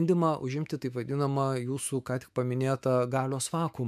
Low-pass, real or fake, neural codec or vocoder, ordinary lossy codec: 14.4 kHz; fake; autoencoder, 48 kHz, 128 numbers a frame, DAC-VAE, trained on Japanese speech; MP3, 96 kbps